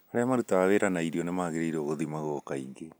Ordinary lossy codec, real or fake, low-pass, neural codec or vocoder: none; real; none; none